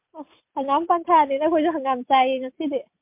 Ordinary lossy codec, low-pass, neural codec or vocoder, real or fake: MP3, 32 kbps; 3.6 kHz; none; real